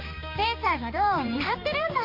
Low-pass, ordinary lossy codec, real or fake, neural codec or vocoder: 5.4 kHz; MP3, 32 kbps; fake; codec, 16 kHz, 2 kbps, X-Codec, HuBERT features, trained on balanced general audio